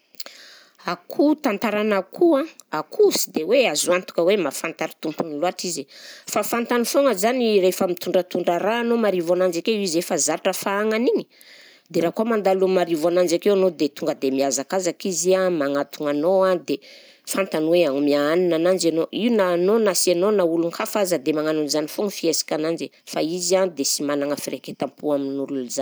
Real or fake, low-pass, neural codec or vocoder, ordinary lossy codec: real; none; none; none